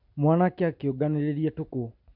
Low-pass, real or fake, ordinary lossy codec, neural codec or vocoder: 5.4 kHz; real; none; none